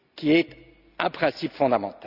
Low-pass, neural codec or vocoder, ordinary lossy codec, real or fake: 5.4 kHz; none; none; real